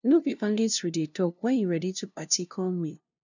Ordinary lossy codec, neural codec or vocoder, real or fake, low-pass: none; codec, 16 kHz, 0.5 kbps, FunCodec, trained on LibriTTS, 25 frames a second; fake; 7.2 kHz